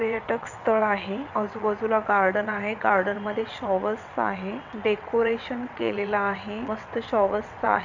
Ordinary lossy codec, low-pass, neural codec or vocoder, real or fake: none; 7.2 kHz; vocoder, 22.05 kHz, 80 mel bands, WaveNeXt; fake